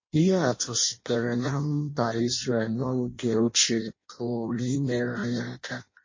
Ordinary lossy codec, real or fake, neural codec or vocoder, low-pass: MP3, 32 kbps; fake; codec, 16 kHz in and 24 kHz out, 0.6 kbps, FireRedTTS-2 codec; 7.2 kHz